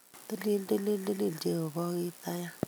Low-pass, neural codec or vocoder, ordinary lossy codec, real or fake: none; none; none; real